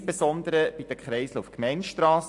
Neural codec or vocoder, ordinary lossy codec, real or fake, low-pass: none; AAC, 64 kbps; real; 10.8 kHz